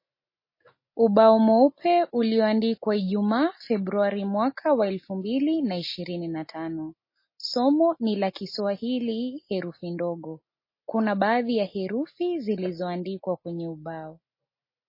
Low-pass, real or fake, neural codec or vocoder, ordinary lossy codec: 5.4 kHz; real; none; MP3, 24 kbps